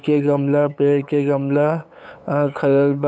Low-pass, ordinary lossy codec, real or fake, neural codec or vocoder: none; none; fake; codec, 16 kHz, 8 kbps, FunCodec, trained on LibriTTS, 25 frames a second